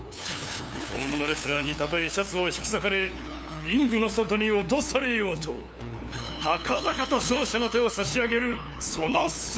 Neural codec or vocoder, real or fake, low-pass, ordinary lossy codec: codec, 16 kHz, 2 kbps, FunCodec, trained on LibriTTS, 25 frames a second; fake; none; none